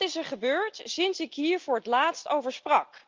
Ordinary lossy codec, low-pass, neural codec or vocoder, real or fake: Opus, 24 kbps; 7.2 kHz; none; real